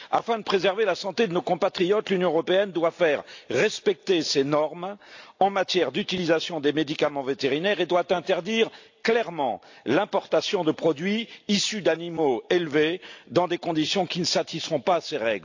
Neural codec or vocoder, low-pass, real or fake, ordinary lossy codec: none; 7.2 kHz; real; AAC, 48 kbps